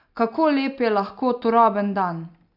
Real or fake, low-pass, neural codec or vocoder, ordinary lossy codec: real; 5.4 kHz; none; none